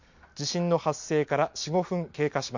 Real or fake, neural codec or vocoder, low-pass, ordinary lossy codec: real; none; 7.2 kHz; MP3, 48 kbps